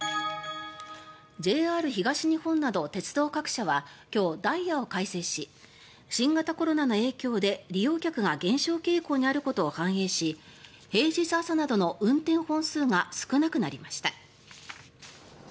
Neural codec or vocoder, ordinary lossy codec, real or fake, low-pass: none; none; real; none